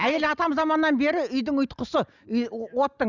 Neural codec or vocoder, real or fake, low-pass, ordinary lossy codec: none; real; 7.2 kHz; none